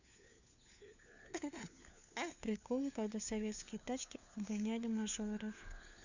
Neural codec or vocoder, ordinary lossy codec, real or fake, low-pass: codec, 16 kHz, 4 kbps, FunCodec, trained on LibriTTS, 50 frames a second; none; fake; 7.2 kHz